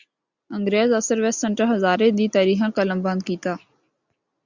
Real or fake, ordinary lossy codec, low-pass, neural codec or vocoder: real; Opus, 64 kbps; 7.2 kHz; none